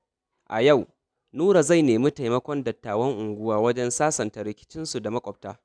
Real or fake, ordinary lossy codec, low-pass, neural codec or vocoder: real; none; 9.9 kHz; none